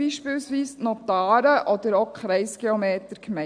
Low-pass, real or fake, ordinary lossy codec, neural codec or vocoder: 9.9 kHz; real; AAC, 64 kbps; none